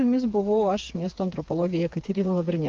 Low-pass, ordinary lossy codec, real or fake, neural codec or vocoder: 7.2 kHz; Opus, 24 kbps; fake; codec, 16 kHz, 4 kbps, FreqCodec, smaller model